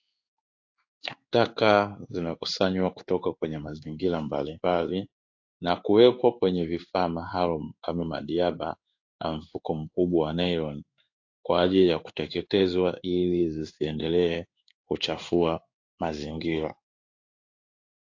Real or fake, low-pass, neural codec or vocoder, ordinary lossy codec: fake; 7.2 kHz; codec, 16 kHz in and 24 kHz out, 1 kbps, XY-Tokenizer; AAC, 48 kbps